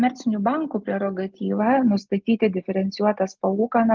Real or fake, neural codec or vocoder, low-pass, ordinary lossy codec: real; none; 7.2 kHz; Opus, 32 kbps